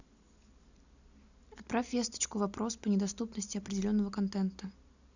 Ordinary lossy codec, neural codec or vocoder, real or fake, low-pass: none; none; real; 7.2 kHz